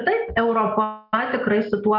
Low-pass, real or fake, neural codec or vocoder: 5.4 kHz; real; none